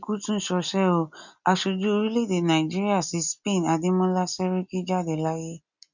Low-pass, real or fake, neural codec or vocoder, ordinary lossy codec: 7.2 kHz; real; none; none